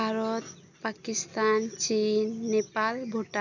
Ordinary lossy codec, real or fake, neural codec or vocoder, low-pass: none; real; none; 7.2 kHz